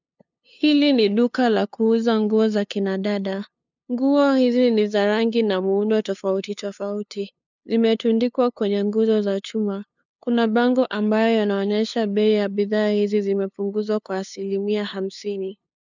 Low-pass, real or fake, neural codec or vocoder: 7.2 kHz; fake; codec, 16 kHz, 2 kbps, FunCodec, trained on LibriTTS, 25 frames a second